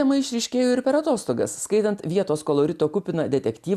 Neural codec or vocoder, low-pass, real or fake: none; 14.4 kHz; real